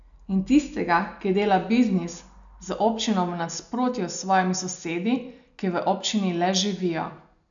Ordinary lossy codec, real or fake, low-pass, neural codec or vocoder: MP3, 96 kbps; real; 7.2 kHz; none